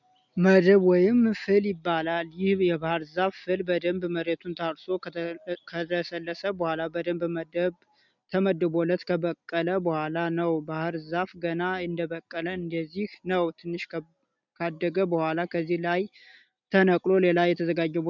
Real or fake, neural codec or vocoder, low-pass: real; none; 7.2 kHz